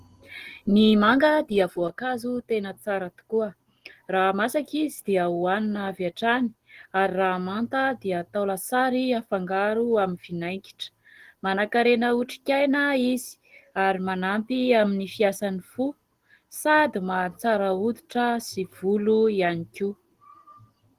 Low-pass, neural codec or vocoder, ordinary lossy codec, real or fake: 14.4 kHz; none; Opus, 16 kbps; real